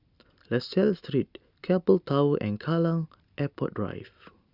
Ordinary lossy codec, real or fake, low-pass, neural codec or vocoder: Opus, 64 kbps; real; 5.4 kHz; none